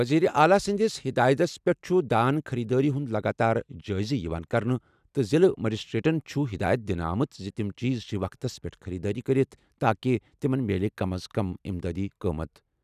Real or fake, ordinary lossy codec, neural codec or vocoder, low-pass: real; none; none; 14.4 kHz